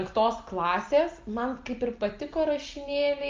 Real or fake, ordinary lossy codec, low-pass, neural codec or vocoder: real; Opus, 24 kbps; 7.2 kHz; none